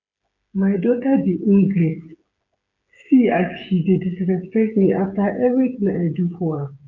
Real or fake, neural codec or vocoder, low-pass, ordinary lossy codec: fake; codec, 16 kHz, 8 kbps, FreqCodec, smaller model; 7.2 kHz; none